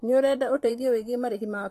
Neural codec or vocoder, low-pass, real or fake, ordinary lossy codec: vocoder, 44.1 kHz, 128 mel bands, Pupu-Vocoder; 14.4 kHz; fake; AAC, 48 kbps